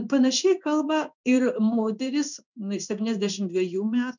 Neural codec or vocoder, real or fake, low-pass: codec, 16 kHz in and 24 kHz out, 1 kbps, XY-Tokenizer; fake; 7.2 kHz